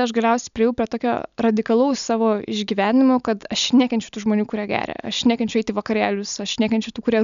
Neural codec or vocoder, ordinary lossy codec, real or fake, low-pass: none; MP3, 96 kbps; real; 7.2 kHz